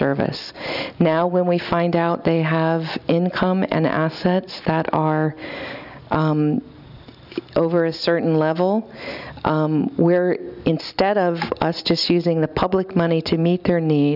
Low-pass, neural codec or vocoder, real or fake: 5.4 kHz; none; real